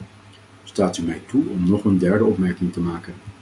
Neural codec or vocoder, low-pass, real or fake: none; 10.8 kHz; real